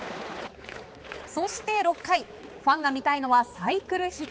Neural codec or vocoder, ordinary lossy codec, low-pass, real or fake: codec, 16 kHz, 4 kbps, X-Codec, HuBERT features, trained on balanced general audio; none; none; fake